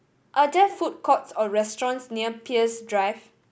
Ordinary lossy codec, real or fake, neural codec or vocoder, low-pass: none; real; none; none